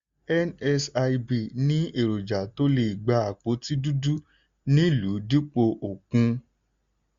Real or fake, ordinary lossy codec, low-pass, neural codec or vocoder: real; Opus, 64 kbps; 7.2 kHz; none